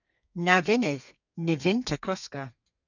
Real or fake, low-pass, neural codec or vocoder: fake; 7.2 kHz; codec, 32 kHz, 1.9 kbps, SNAC